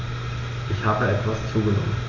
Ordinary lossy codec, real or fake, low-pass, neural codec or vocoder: AAC, 32 kbps; real; 7.2 kHz; none